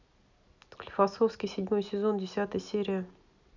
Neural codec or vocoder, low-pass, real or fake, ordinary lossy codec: none; 7.2 kHz; real; none